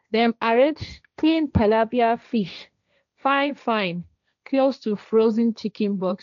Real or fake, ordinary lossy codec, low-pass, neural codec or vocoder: fake; none; 7.2 kHz; codec, 16 kHz, 1.1 kbps, Voila-Tokenizer